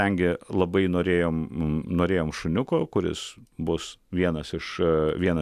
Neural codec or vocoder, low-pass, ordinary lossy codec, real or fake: none; 14.4 kHz; AAC, 96 kbps; real